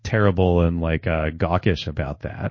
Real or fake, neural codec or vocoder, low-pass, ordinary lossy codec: fake; codec, 16 kHz in and 24 kHz out, 1 kbps, XY-Tokenizer; 7.2 kHz; MP3, 32 kbps